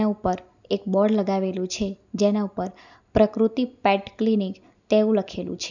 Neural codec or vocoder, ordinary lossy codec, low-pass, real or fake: none; none; 7.2 kHz; real